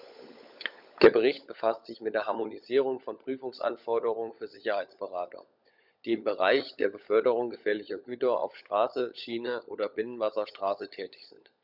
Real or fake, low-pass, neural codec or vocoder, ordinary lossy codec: fake; 5.4 kHz; codec, 16 kHz, 16 kbps, FunCodec, trained on LibriTTS, 50 frames a second; none